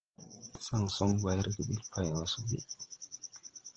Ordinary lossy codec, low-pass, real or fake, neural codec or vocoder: Opus, 16 kbps; 7.2 kHz; fake; codec, 16 kHz, 16 kbps, FreqCodec, larger model